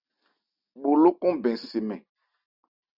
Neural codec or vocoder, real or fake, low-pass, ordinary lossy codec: none; real; 5.4 kHz; Opus, 64 kbps